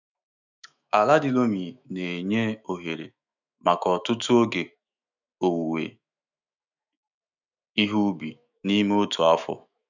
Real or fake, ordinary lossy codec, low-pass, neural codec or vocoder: fake; none; 7.2 kHz; autoencoder, 48 kHz, 128 numbers a frame, DAC-VAE, trained on Japanese speech